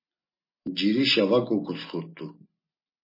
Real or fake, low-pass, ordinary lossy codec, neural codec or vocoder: real; 5.4 kHz; MP3, 24 kbps; none